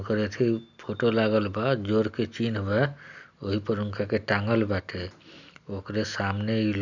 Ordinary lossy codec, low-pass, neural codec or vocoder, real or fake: none; 7.2 kHz; none; real